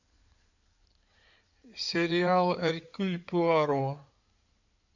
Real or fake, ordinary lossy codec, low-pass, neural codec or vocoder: fake; none; 7.2 kHz; codec, 16 kHz in and 24 kHz out, 2.2 kbps, FireRedTTS-2 codec